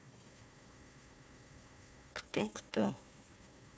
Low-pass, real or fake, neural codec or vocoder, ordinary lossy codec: none; fake; codec, 16 kHz, 1 kbps, FunCodec, trained on Chinese and English, 50 frames a second; none